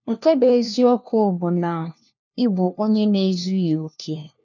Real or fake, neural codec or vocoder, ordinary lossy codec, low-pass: fake; codec, 16 kHz, 1 kbps, FunCodec, trained on LibriTTS, 50 frames a second; none; 7.2 kHz